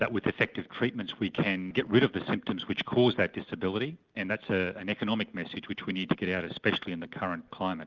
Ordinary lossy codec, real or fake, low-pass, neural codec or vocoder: Opus, 32 kbps; real; 7.2 kHz; none